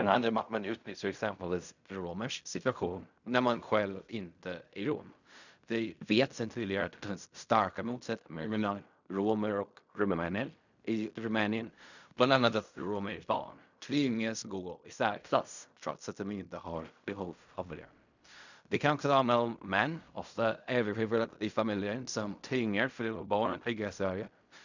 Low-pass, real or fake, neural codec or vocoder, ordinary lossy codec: 7.2 kHz; fake; codec, 16 kHz in and 24 kHz out, 0.4 kbps, LongCat-Audio-Codec, fine tuned four codebook decoder; none